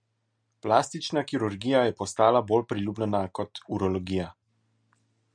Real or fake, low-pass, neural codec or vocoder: real; 9.9 kHz; none